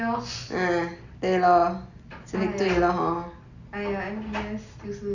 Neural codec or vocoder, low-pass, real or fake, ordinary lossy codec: none; 7.2 kHz; real; none